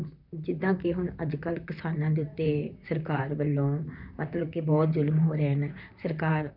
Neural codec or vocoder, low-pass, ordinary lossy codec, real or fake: vocoder, 44.1 kHz, 128 mel bands, Pupu-Vocoder; 5.4 kHz; none; fake